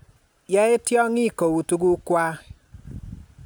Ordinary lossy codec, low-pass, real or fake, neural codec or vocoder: none; none; real; none